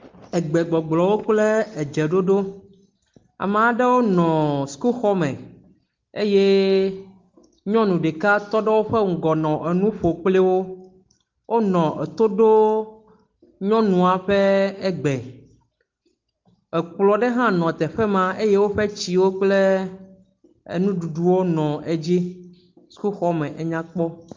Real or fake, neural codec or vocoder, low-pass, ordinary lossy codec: real; none; 7.2 kHz; Opus, 32 kbps